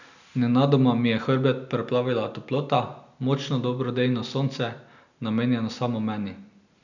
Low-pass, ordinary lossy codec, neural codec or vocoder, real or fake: 7.2 kHz; none; none; real